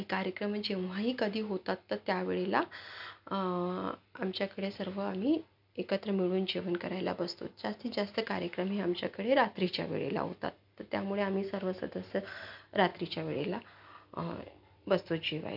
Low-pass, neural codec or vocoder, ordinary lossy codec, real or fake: 5.4 kHz; none; none; real